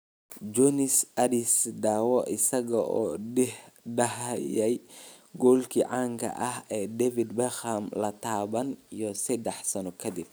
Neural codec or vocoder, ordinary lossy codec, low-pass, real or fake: none; none; none; real